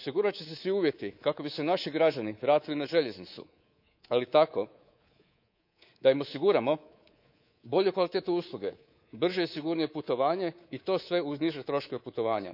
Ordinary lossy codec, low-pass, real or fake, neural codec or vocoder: none; 5.4 kHz; fake; codec, 24 kHz, 3.1 kbps, DualCodec